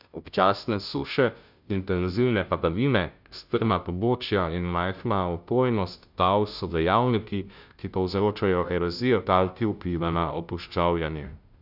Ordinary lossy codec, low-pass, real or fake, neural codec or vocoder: none; 5.4 kHz; fake; codec, 16 kHz, 0.5 kbps, FunCodec, trained on Chinese and English, 25 frames a second